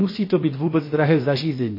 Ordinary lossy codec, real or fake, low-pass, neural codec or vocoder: MP3, 24 kbps; fake; 5.4 kHz; codec, 16 kHz, about 1 kbps, DyCAST, with the encoder's durations